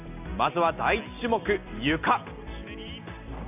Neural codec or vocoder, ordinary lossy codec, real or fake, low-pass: none; none; real; 3.6 kHz